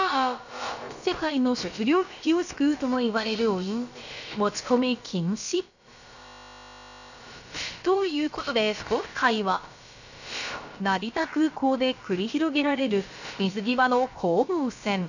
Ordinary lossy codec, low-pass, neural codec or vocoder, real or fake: none; 7.2 kHz; codec, 16 kHz, about 1 kbps, DyCAST, with the encoder's durations; fake